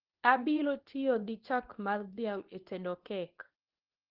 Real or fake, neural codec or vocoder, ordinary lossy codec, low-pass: fake; codec, 24 kHz, 0.9 kbps, WavTokenizer, medium speech release version 2; Opus, 16 kbps; 5.4 kHz